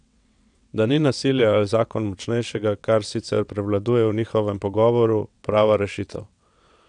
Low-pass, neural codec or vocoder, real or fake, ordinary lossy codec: 9.9 kHz; vocoder, 22.05 kHz, 80 mel bands, WaveNeXt; fake; none